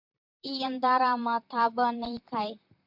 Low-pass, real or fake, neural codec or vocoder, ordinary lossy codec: 5.4 kHz; fake; vocoder, 44.1 kHz, 128 mel bands, Pupu-Vocoder; MP3, 48 kbps